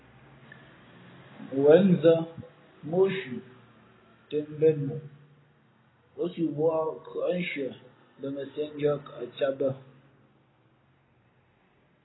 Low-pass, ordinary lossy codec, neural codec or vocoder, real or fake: 7.2 kHz; AAC, 16 kbps; none; real